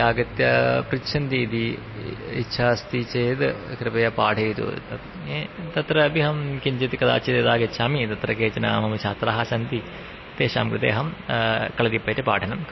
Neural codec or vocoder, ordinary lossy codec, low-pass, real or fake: none; MP3, 24 kbps; 7.2 kHz; real